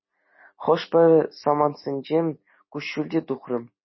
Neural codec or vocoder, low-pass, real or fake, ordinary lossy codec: none; 7.2 kHz; real; MP3, 24 kbps